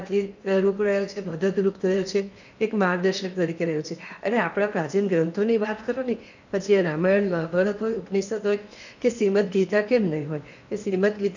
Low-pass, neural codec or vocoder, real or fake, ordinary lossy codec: 7.2 kHz; codec, 16 kHz in and 24 kHz out, 0.8 kbps, FocalCodec, streaming, 65536 codes; fake; none